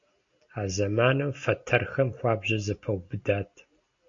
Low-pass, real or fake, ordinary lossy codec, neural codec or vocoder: 7.2 kHz; real; AAC, 48 kbps; none